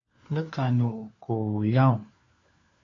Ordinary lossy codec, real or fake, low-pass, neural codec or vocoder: AAC, 32 kbps; fake; 7.2 kHz; codec, 16 kHz, 4 kbps, FunCodec, trained on LibriTTS, 50 frames a second